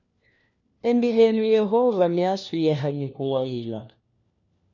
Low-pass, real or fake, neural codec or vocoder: 7.2 kHz; fake; codec, 16 kHz, 1 kbps, FunCodec, trained on LibriTTS, 50 frames a second